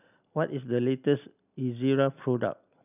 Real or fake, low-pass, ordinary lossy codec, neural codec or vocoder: real; 3.6 kHz; none; none